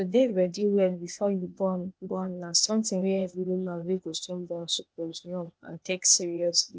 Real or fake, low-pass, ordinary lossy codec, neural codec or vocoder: fake; none; none; codec, 16 kHz, 0.8 kbps, ZipCodec